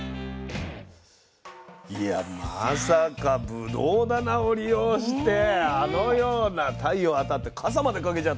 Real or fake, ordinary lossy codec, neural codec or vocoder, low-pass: real; none; none; none